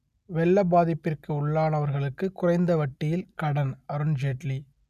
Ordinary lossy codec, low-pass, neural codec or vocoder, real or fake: none; 14.4 kHz; none; real